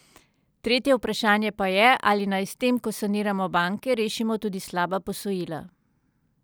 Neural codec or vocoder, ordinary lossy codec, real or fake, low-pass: none; none; real; none